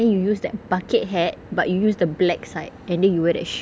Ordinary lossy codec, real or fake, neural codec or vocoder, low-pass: none; real; none; none